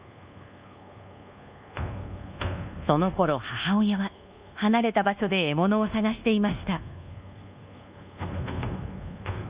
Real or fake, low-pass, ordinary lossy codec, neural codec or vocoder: fake; 3.6 kHz; Opus, 64 kbps; codec, 24 kHz, 1.2 kbps, DualCodec